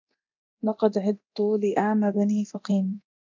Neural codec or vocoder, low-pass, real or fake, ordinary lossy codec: codec, 24 kHz, 0.9 kbps, DualCodec; 7.2 kHz; fake; MP3, 64 kbps